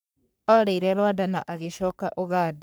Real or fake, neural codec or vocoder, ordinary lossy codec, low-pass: fake; codec, 44.1 kHz, 3.4 kbps, Pupu-Codec; none; none